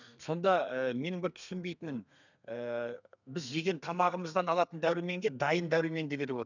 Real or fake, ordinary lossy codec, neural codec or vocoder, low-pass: fake; none; codec, 32 kHz, 1.9 kbps, SNAC; 7.2 kHz